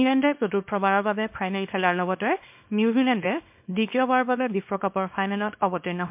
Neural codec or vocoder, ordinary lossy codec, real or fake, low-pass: codec, 24 kHz, 0.9 kbps, WavTokenizer, small release; MP3, 32 kbps; fake; 3.6 kHz